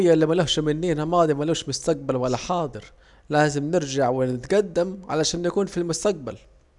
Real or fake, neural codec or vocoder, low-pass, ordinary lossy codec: real; none; 10.8 kHz; none